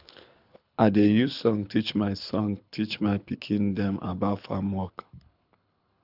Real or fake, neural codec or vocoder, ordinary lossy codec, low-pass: fake; codec, 24 kHz, 6 kbps, HILCodec; none; 5.4 kHz